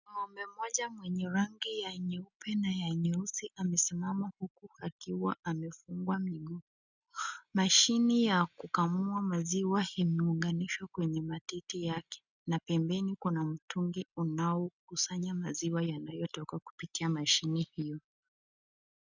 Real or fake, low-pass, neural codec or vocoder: real; 7.2 kHz; none